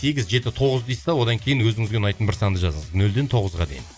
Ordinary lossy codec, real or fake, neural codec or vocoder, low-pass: none; real; none; none